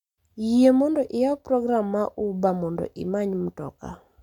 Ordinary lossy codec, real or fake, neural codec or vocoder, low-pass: none; real; none; 19.8 kHz